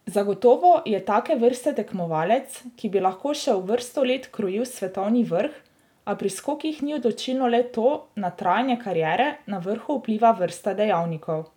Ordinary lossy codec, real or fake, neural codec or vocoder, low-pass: none; real; none; 19.8 kHz